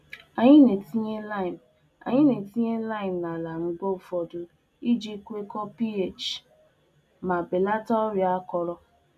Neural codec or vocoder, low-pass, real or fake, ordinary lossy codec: none; 14.4 kHz; real; none